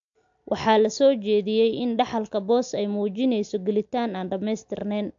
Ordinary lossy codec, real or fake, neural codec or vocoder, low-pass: AAC, 64 kbps; real; none; 7.2 kHz